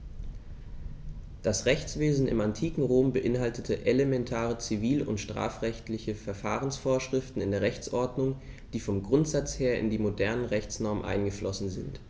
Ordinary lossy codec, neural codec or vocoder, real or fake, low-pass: none; none; real; none